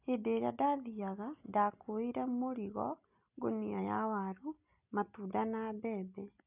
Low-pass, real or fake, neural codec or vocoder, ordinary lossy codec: 3.6 kHz; real; none; none